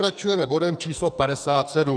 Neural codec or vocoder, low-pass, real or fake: codec, 44.1 kHz, 2.6 kbps, SNAC; 9.9 kHz; fake